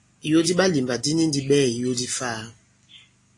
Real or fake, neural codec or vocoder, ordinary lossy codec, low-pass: real; none; AAC, 48 kbps; 10.8 kHz